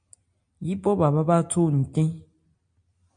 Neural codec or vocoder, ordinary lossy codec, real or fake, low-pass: none; MP3, 48 kbps; real; 9.9 kHz